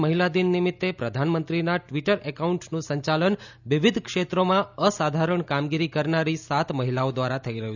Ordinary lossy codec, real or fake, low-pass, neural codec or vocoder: none; real; 7.2 kHz; none